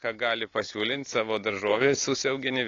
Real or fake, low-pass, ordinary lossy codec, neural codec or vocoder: real; 7.2 kHz; AAC, 32 kbps; none